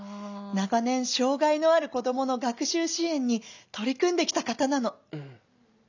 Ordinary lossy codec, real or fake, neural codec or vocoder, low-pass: none; real; none; 7.2 kHz